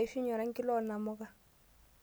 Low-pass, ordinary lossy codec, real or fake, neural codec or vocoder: none; none; real; none